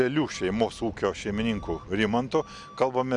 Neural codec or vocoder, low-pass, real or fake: none; 10.8 kHz; real